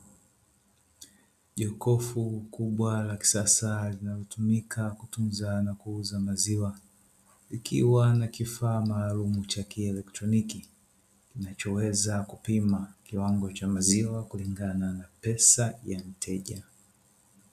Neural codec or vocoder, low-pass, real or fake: none; 14.4 kHz; real